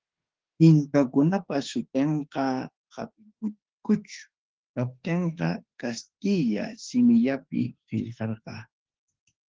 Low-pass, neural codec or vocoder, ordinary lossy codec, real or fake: 7.2 kHz; autoencoder, 48 kHz, 32 numbers a frame, DAC-VAE, trained on Japanese speech; Opus, 16 kbps; fake